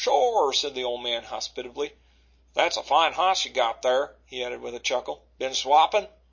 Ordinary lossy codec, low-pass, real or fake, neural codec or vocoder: MP3, 32 kbps; 7.2 kHz; real; none